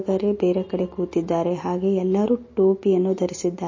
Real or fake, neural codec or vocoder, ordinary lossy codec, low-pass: real; none; MP3, 32 kbps; 7.2 kHz